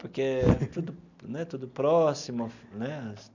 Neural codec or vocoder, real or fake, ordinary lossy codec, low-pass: none; real; none; 7.2 kHz